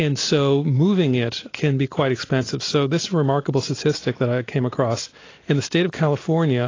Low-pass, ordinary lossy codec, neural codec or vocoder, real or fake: 7.2 kHz; AAC, 32 kbps; none; real